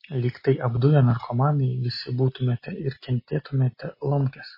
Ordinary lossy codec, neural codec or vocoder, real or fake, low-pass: MP3, 24 kbps; none; real; 5.4 kHz